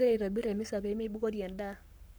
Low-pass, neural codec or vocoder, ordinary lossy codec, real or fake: none; codec, 44.1 kHz, 7.8 kbps, Pupu-Codec; none; fake